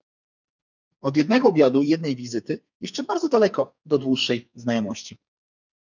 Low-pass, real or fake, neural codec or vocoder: 7.2 kHz; fake; codec, 44.1 kHz, 2.6 kbps, SNAC